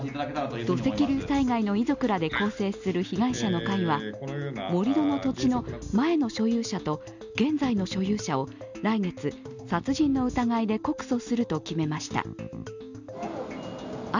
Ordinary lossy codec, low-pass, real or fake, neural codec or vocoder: none; 7.2 kHz; real; none